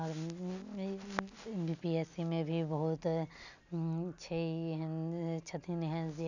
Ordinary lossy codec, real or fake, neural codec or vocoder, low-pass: none; real; none; 7.2 kHz